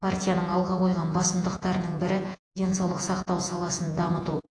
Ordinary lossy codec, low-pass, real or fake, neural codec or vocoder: AAC, 48 kbps; 9.9 kHz; fake; vocoder, 48 kHz, 128 mel bands, Vocos